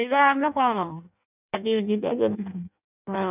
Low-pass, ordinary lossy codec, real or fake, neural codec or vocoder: 3.6 kHz; none; fake; codec, 16 kHz in and 24 kHz out, 0.6 kbps, FireRedTTS-2 codec